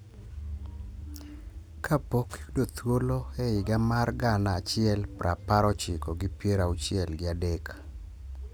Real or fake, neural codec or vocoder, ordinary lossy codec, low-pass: real; none; none; none